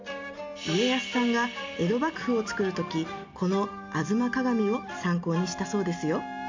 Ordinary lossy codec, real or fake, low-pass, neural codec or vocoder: MP3, 64 kbps; real; 7.2 kHz; none